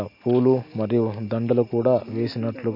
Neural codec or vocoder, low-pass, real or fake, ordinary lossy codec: none; 5.4 kHz; real; MP3, 32 kbps